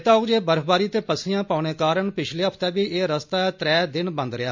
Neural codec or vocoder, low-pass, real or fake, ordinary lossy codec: none; 7.2 kHz; real; MP3, 48 kbps